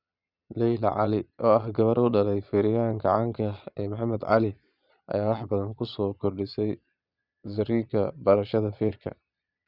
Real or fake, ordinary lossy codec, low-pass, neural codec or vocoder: fake; none; 5.4 kHz; vocoder, 22.05 kHz, 80 mel bands, Vocos